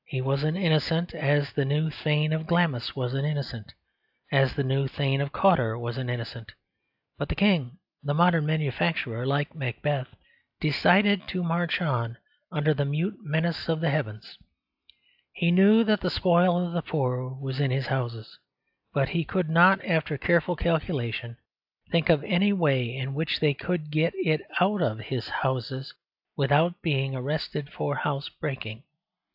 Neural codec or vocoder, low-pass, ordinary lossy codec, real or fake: none; 5.4 kHz; AAC, 48 kbps; real